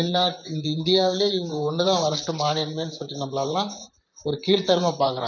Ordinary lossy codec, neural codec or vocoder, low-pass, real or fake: none; vocoder, 44.1 kHz, 128 mel bands, Pupu-Vocoder; 7.2 kHz; fake